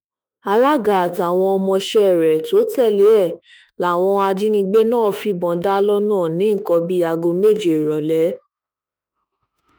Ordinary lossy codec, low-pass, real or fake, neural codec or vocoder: none; none; fake; autoencoder, 48 kHz, 32 numbers a frame, DAC-VAE, trained on Japanese speech